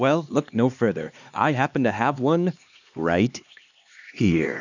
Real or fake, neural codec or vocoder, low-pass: fake; codec, 16 kHz, 2 kbps, X-Codec, HuBERT features, trained on LibriSpeech; 7.2 kHz